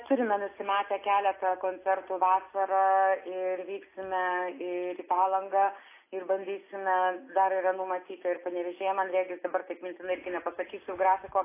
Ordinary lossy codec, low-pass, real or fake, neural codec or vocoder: AAC, 24 kbps; 3.6 kHz; real; none